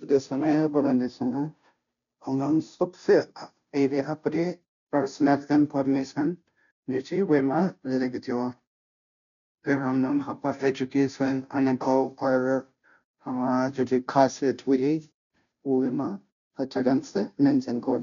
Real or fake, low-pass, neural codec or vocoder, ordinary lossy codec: fake; 7.2 kHz; codec, 16 kHz, 0.5 kbps, FunCodec, trained on Chinese and English, 25 frames a second; none